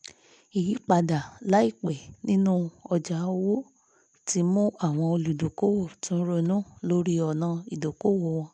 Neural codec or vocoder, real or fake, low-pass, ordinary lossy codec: none; real; 9.9 kHz; MP3, 96 kbps